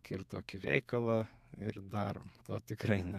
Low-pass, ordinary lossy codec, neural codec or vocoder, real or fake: 14.4 kHz; MP3, 96 kbps; codec, 32 kHz, 1.9 kbps, SNAC; fake